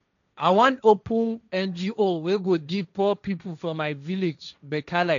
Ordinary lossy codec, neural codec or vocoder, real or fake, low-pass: none; codec, 16 kHz, 1.1 kbps, Voila-Tokenizer; fake; 7.2 kHz